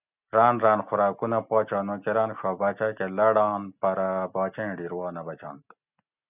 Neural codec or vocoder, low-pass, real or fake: none; 3.6 kHz; real